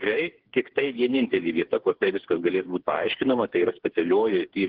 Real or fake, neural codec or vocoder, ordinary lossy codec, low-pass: fake; codec, 16 kHz, 4 kbps, FreqCodec, smaller model; Opus, 16 kbps; 5.4 kHz